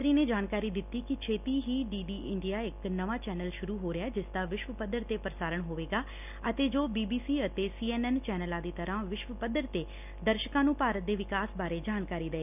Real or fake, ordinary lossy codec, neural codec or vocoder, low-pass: real; none; none; 3.6 kHz